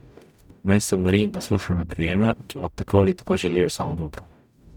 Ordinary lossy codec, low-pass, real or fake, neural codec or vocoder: none; 19.8 kHz; fake; codec, 44.1 kHz, 0.9 kbps, DAC